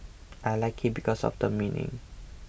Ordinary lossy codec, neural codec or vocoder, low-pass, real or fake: none; none; none; real